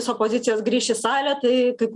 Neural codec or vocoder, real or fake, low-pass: none; real; 10.8 kHz